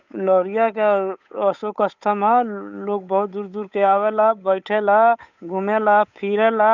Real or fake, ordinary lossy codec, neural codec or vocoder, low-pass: fake; none; codec, 16 kHz, 8 kbps, FunCodec, trained on Chinese and English, 25 frames a second; 7.2 kHz